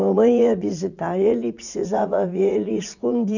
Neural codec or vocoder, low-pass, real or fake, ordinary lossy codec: vocoder, 44.1 kHz, 80 mel bands, Vocos; 7.2 kHz; fake; none